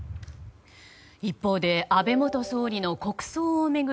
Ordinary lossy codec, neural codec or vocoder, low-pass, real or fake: none; none; none; real